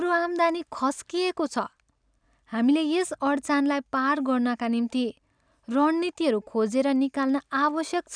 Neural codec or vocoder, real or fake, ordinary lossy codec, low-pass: none; real; none; 9.9 kHz